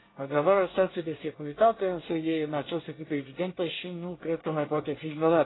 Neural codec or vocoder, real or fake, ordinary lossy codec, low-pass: codec, 24 kHz, 1 kbps, SNAC; fake; AAC, 16 kbps; 7.2 kHz